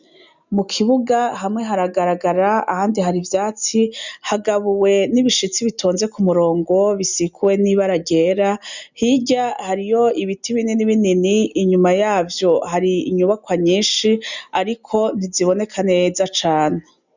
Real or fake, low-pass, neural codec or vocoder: real; 7.2 kHz; none